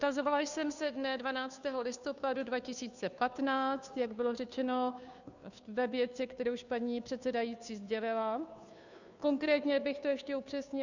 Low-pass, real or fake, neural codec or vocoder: 7.2 kHz; fake; codec, 16 kHz, 2 kbps, FunCodec, trained on Chinese and English, 25 frames a second